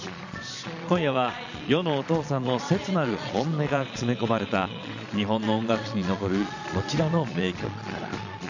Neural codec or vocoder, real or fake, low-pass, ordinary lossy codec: vocoder, 22.05 kHz, 80 mel bands, Vocos; fake; 7.2 kHz; none